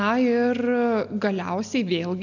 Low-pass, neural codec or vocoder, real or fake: 7.2 kHz; none; real